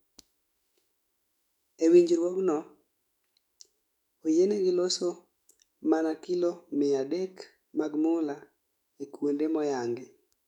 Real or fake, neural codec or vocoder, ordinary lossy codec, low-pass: fake; autoencoder, 48 kHz, 128 numbers a frame, DAC-VAE, trained on Japanese speech; none; 19.8 kHz